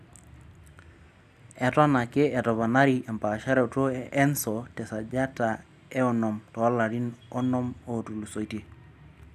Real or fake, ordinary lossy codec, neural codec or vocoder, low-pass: real; none; none; 14.4 kHz